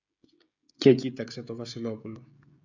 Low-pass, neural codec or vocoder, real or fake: 7.2 kHz; codec, 16 kHz, 16 kbps, FreqCodec, smaller model; fake